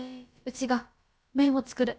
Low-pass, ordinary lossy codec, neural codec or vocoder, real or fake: none; none; codec, 16 kHz, about 1 kbps, DyCAST, with the encoder's durations; fake